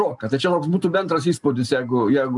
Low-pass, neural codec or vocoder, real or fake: 10.8 kHz; none; real